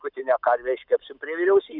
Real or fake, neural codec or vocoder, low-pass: real; none; 5.4 kHz